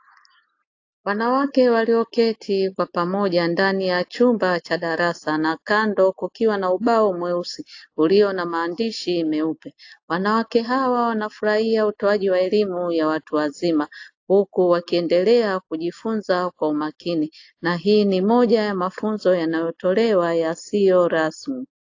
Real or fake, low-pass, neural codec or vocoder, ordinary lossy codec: real; 7.2 kHz; none; AAC, 48 kbps